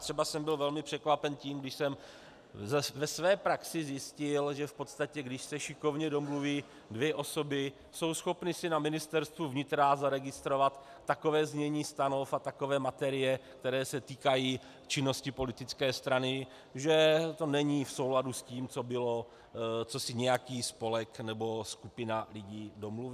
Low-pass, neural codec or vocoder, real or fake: 14.4 kHz; none; real